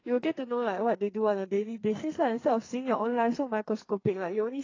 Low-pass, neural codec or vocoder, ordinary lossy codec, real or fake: 7.2 kHz; codec, 44.1 kHz, 2.6 kbps, SNAC; AAC, 32 kbps; fake